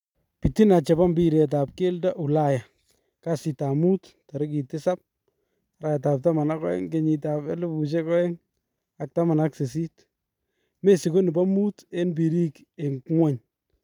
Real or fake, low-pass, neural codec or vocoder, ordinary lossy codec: fake; 19.8 kHz; vocoder, 44.1 kHz, 128 mel bands every 512 samples, BigVGAN v2; none